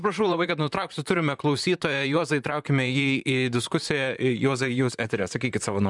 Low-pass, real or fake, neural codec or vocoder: 10.8 kHz; fake; vocoder, 44.1 kHz, 128 mel bands, Pupu-Vocoder